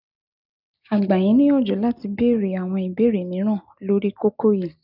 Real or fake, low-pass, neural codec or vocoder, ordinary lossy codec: real; 5.4 kHz; none; none